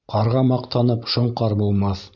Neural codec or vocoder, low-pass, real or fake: none; 7.2 kHz; real